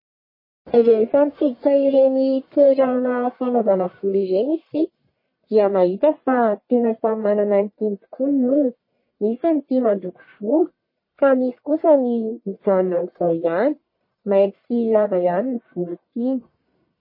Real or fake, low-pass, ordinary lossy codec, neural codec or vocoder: fake; 5.4 kHz; MP3, 24 kbps; codec, 44.1 kHz, 1.7 kbps, Pupu-Codec